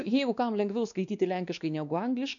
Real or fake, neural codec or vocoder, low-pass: fake; codec, 16 kHz, 2 kbps, X-Codec, WavLM features, trained on Multilingual LibriSpeech; 7.2 kHz